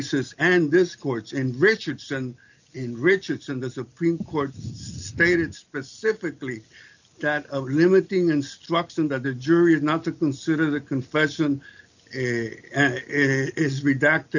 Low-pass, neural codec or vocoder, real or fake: 7.2 kHz; none; real